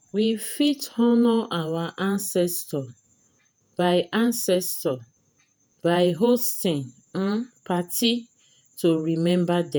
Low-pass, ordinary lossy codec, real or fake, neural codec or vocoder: none; none; fake; vocoder, 48 kHz, 128 mel bands, Vocos